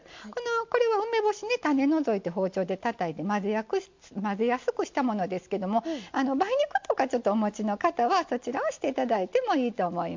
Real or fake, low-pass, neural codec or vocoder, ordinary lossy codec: real; 7.2 kHz; none; MP3, 48 kbps